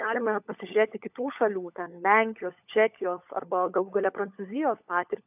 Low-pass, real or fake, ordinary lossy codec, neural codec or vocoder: 3.6 kHz; fake; AAC, 32 kbps; codec, 16 kHz, 16 kbps, FunCodec, trained on LibriTTS, 50 frames a second